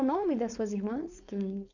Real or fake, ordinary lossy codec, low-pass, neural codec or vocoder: fake; none; 7.2 kHz; codec, 16 kHz, 4.8 kbps, FACodec